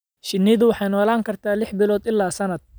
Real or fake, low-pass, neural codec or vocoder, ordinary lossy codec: real; none; none; none